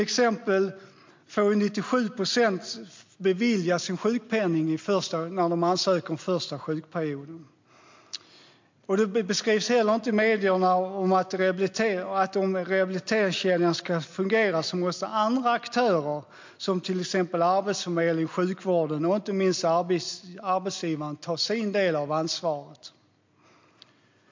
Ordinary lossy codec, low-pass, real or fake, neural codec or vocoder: MP3, 48 kbps; 7.2 kHz; real; none